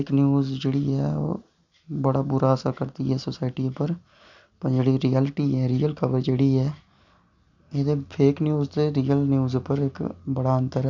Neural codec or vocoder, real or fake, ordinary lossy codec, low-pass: none; real; none; 7.2 kHz